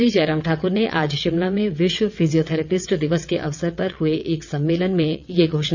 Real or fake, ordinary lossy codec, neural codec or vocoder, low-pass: fake; none; vocoder, 22.05 kHz, 80 mel bands, WaveNeXt; 7.2 kHz